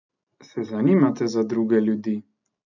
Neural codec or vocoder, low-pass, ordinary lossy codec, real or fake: none; 7.2 kHz; none; real